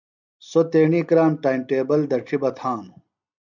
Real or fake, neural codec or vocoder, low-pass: real; none; 7.2 kHz